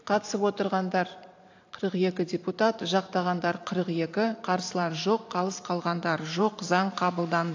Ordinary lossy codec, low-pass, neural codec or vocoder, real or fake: AAC, 48 kbps; 7.2 kHz; vocoder, 44.1 kHz, 128 mel bands every 512 samples, BigVGAN v2; fake